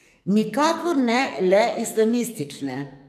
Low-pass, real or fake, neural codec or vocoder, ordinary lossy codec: 14.4 kHz; fake; codec, 44.1 kHz, 2.6 kbps, SNAC; none